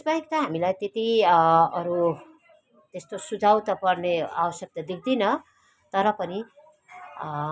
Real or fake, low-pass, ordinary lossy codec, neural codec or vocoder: real; none; none; none